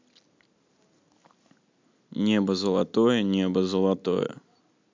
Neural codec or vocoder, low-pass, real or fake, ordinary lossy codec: none; 7.2 kHz; real; MP3, 64 kbps